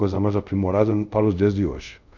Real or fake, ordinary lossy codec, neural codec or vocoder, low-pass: fake; none; codec, 24 kHz, 0.5 kbps, DualCodec; 7.2 kHz